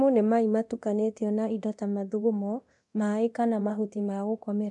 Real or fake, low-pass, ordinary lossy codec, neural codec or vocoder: fake; 10.8 kHz; MP3, 64 kbps; codec, 24 kHz, 0.9 kbps, DualCodec